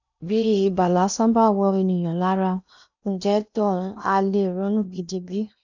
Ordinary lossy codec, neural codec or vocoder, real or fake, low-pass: none; codec, 16 kHz in and 24 kHz out, 0.8 kbps, FocalCodec, streaming, 65536 codes; fake; 7.2 kHz